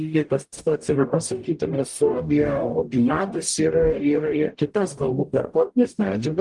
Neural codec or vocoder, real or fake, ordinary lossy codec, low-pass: codec, 44.1 kHz, 0.9 kbps, DAC; fake; Opus, 24 kbps; 10.8 kHz